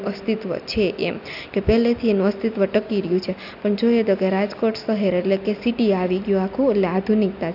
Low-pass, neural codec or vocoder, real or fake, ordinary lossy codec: 5.4 kHz; none; real; AAC, 48 kbps